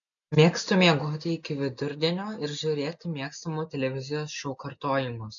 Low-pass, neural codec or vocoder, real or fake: 7.2 kHz; none; real